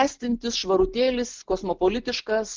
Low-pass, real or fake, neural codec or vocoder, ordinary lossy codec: 7.2 kHz; real; none; Opus, 16 kbps